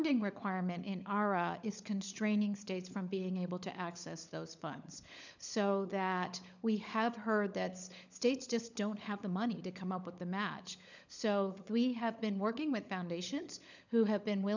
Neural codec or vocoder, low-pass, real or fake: codec, 16 kHz, 16 kbps, FunCodec, trained on LibriTTS, 50 frames a second; 7.2 kHz; fake